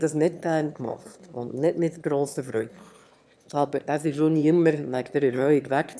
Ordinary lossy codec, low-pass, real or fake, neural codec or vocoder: none; none; fake; autoencoder, 22.05 kHz, a latent of 192 numbers a frame, VITS, trained on one speaker